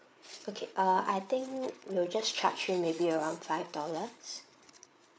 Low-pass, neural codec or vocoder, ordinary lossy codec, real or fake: none; codec, 16 kHz, 8 kbps, FreqCodec, larger model; none; fake